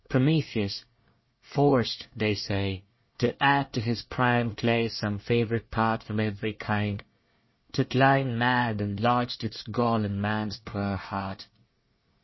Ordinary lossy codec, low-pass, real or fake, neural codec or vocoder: MP3, 24 kbps; 7.2 kHz; fake; codec, 24 kHz, 1 kbps, SNAC